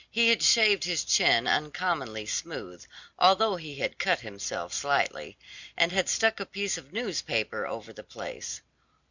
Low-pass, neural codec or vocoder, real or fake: 7.2 kHz; none; real